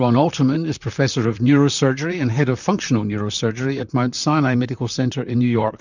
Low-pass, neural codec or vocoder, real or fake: 7.2 kHz; vocoder, 44.1 kHz, 128 mel bands, Pupu-Vocoder; fake